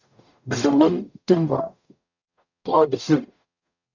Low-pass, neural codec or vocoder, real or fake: 7.2 kHz; codec, 44.1 kHz, 0.9 kbps, DAC; fake